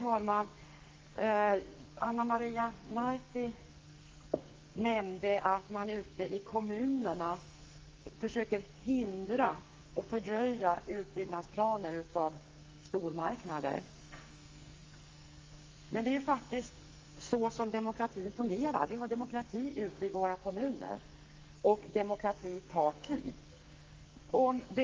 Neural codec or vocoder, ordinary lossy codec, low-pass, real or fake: codec, 44.1 kHz, 2.6 kbps, SNAC; Opus, 32 kbps; 7.2 kHz; fake